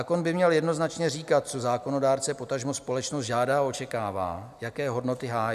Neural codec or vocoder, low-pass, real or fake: none; 14.4 kHz; real